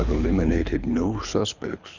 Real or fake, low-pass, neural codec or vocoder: fake; 7.2 kHz; codec, 24 kHz, 6 kbps, HILCodec